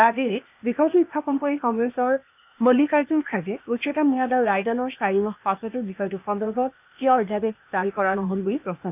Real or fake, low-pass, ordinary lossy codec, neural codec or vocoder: fake; 3.6 kHz; none; codec, 16 kHz, 0.8 kbps, ZipCodec